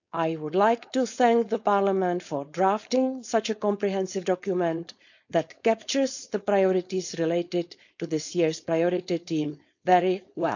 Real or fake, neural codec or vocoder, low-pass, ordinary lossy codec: fake; codec, 16 kHz, 4.8 kbps, FACodec; 7.2 kHz; none